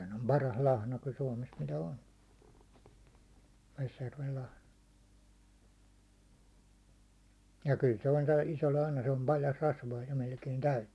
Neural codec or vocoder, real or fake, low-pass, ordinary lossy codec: none; real; none; none